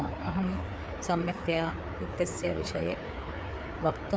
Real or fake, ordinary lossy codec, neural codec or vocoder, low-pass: fake; none; codec, 16 kHz, 4 kbps, FreqCodec, larger model; none